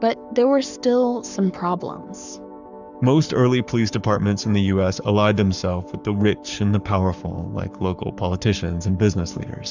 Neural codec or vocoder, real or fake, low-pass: codec, 44.1 kHz, 7.8 kbps, Pupu-Codec; fake; 7.2 kHz